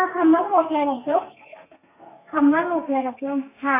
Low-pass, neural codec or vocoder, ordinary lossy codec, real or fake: 3.6 kHz; codec, 32 kHz, 1.9 kbps, SNAC; none; fake